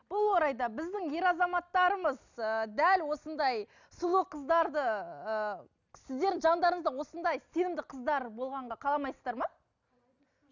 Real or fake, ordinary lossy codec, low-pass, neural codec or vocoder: real; none; 7.2 kHz; none